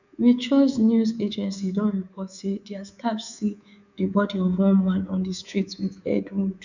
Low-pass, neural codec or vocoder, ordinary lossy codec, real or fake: 7.2 kHz; codec, 24 kHz, 3.1 kbps, DualCodec; none; fake